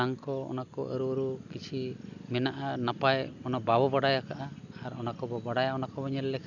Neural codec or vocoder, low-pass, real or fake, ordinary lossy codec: none; 7.2 kHz; real; none